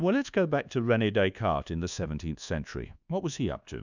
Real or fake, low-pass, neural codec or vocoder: fake; 7.2 kHz; codec, 24 kHz, 1.2 kbps, DualCodec